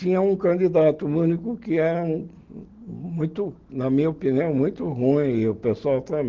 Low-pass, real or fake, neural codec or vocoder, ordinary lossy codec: 7.2 kHz; real; none; Opus, 16 kbps